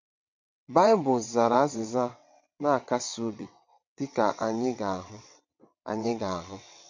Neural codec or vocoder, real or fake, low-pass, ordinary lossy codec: vocoder, 22.05 kHz, 80 mel bands, WaveNeXt; fake; 7.2 kHz; MP3, 48 kbps